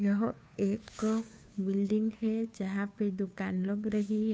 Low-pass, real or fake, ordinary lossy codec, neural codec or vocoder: none; fake; none; codec, 16 kHz, 2 kbps, FunCodec, trained on Chinese and English, 25 frames a second